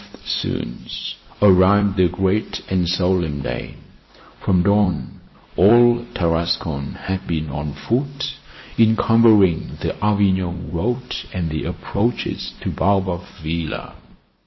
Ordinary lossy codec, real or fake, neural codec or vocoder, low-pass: MP3, 24 kbps; fake; vocoder, 44.1 kHz, 128 mel bands every 256 samples, BigVGAN v2; 7.2 kHz